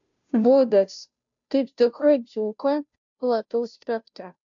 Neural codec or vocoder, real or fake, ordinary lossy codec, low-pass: codec, 16 kHz, 0.5 kbps, FunCodec, trained on Chinese and English, 25 frames a second; fake; AAC, 64 kbps; 7.2 kHz